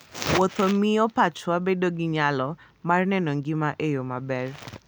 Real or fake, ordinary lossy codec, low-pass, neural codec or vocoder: real; none; none; none